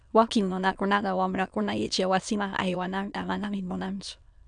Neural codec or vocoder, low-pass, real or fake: autoencoder, 22.05 kHz, a latent of 192 numbers a frame, VITS, trained on many speakers; 9.9 kHz; fake